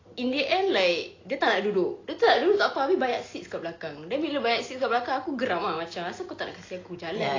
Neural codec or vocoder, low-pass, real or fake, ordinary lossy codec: none; 7.2 kHz; real; AAC, 32 kbps